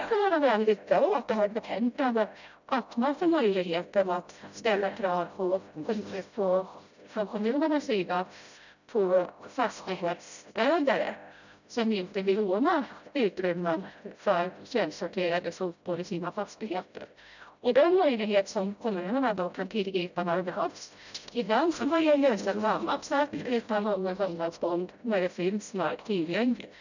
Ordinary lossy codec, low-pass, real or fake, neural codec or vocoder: none; 7.2 kHz; fake; codec, 16 kHz, 0.5 kbps, FreqCodec, smaller model